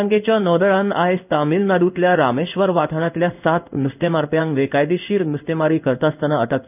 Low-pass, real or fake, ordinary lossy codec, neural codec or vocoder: 3.6 kHz; fake; none; codec, 16 kHz in and 24 kHz out, 1 kbps, XY-Tokenizer